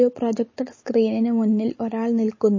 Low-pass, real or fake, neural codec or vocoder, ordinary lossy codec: 7.2 kHz; real; none; MP3, 32 kbps